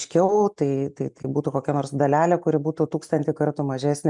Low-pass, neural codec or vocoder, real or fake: 10.8 kHz; none; real